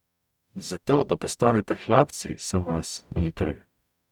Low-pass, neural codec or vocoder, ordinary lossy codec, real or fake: 19.8 kHz; codec, 44.1 kHz, 0.9 kbps, DAC; none; fake